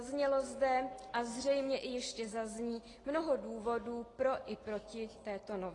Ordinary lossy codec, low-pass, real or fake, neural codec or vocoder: AAC, 32 kbps; 10.8 kHz; real; none